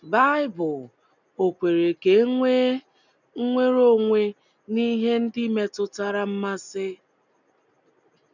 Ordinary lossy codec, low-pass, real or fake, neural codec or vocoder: none; 7.2 kHz; real; none